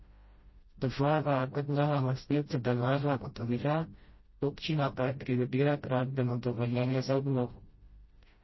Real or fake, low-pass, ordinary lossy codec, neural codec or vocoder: fake; 7.2 kHz; MP3, 24 kbps; codec, 16 kHz, 0.5 kbps, FreqCodec, smaller model